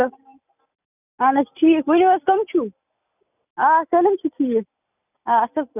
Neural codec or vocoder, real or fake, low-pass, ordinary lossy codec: none; real; 3.6 kHz; none